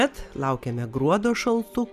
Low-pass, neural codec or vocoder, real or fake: 14.4 kHz; none; real